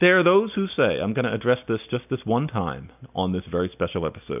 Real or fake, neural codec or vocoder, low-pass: real; none; 3.6 kHz